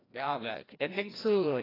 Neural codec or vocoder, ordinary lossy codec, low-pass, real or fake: codec, 16 kHz, 1 kbps, FreqCodec, larger model; AAC, 24 kbps; 5.4 kHz; fake